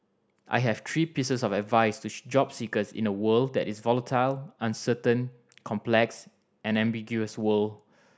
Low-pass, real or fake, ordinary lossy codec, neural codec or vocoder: none; real; none; none